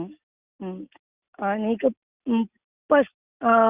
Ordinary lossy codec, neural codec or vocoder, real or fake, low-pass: Opus, 64 kbps; none; real; 3.6 kHz